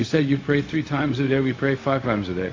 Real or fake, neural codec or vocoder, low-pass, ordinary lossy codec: fake; codec, 16 kHz, 0.4 kbps, LongCat-Audio-Codec; 7.2 kHz; AAC, 32 kbps